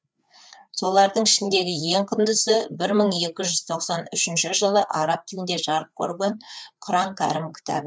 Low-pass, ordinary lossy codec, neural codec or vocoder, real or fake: none; none; codec, 16 kHz, 4 kbps, FreqCodec, larger model; fake